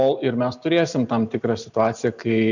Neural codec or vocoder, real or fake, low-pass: none; real; 7.2 kHz